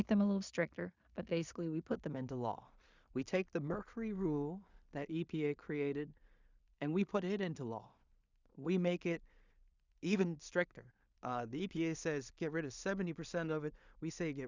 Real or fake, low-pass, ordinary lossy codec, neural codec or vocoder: fake; 7.2 kHz; Opus, 64 kbps; codec, 16 kHz in and 24 kHz out, 0.4 kbps, LongCat-Audio-Codec, two codebook decoder